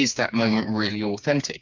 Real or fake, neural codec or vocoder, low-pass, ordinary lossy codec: fake; codec, 16 kHz, 4 kbps, FreqCodec, smaller model; 7.2 kHz; MP3, 64 kbps